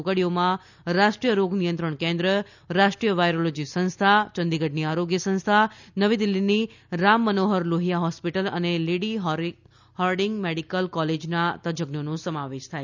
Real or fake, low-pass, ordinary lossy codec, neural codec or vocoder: real; 7.2 kHz; none; none